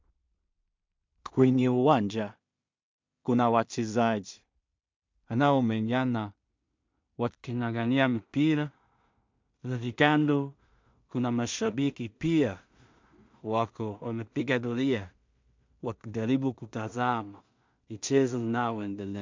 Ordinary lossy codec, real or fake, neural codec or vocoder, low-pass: MP3, 64 kbps; fake; codec, 16 kHz in and 24 kHz out, 0.4 kbps, LongCat-Audio-Codec, two codebook decoder; 7.2 kHz